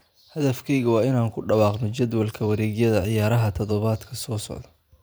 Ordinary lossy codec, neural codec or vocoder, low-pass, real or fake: none; vocoder, 44.1 kHz, 128 mel bands every 512 samples, BigVGAN v2; none; fake